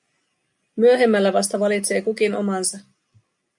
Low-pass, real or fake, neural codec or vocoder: 10.8 kHz; real; none